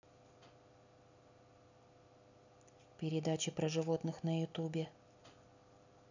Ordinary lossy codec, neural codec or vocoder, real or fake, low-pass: none; none; real; 7.2 kHz